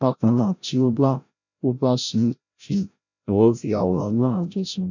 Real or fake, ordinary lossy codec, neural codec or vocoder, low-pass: fake; none; codec, 16 kHz, 0.5 kbps, FreqCodec, larger model; 7.2 kHz